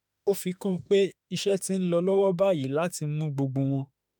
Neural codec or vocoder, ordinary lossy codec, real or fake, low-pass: autoencoder, 48 kHz, 32 numbers a frame, DAC-VAE, trained on Japanese speech; none; fake; none